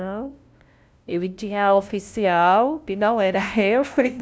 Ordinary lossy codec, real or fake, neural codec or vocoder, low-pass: none; fake; codec, 16 kHz, 0.5 kbps, FunCodec, trained on LibriTTS, 25 frames a second; none